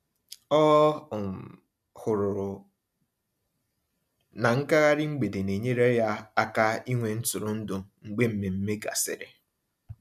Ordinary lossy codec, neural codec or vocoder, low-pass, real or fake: MP3, 96 kbps; none; 14.4 kHz; real